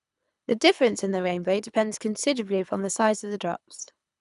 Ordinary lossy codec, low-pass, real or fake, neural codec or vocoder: none; 10.8 kHz; fake; codec, 24 kHz, 3 kbps, HILCodec